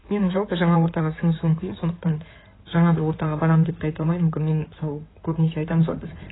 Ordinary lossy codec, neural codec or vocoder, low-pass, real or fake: AAC, 16 kbps; codec, 16 kHz in and 24 kHz out, 2.2 kbps, FireRedTTS-2 codec; 7.2 kHz; fake